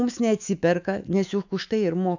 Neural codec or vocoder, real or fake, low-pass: autoencoder, 48 kHz, 128 numbers a frame, DAC-VAE, trained on Japanese speech; fake; 7.2 kHz